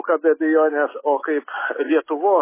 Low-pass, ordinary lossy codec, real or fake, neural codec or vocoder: 3.6 kHz; MP3, 16 kbps; real; none